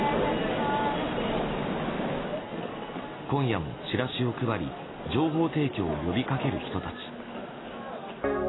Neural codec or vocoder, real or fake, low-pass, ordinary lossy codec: none; real; 7.2 kHz; AAC, 16 kbps